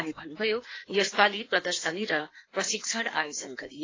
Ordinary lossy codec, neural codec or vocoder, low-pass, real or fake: AAC, 32 kbps; codec, 16 kHz in and 24 kHz out, 1.1 kbps, FireRedTTS-2 codec; 7.2 kHz; fake